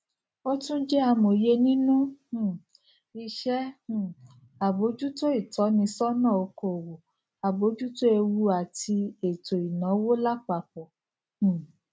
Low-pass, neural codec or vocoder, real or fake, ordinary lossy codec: none; none; real; none